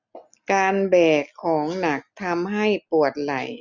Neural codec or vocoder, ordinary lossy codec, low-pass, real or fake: none; none; 7.2 kHz; real